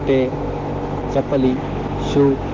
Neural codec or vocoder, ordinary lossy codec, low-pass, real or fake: none; Opus, 16 kbps; 7.2 kHz; real